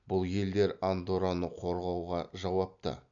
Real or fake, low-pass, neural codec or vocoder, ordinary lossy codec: real; 7.2 kHz; none; none